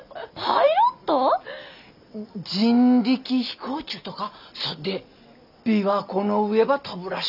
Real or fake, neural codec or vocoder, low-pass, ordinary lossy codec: real; none; 5.4 kHz; none